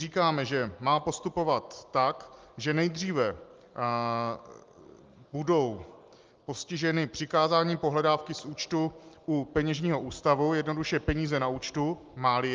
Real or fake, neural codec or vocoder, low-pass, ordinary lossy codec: real; none; 7.2 kHz; Opus, 32 kbps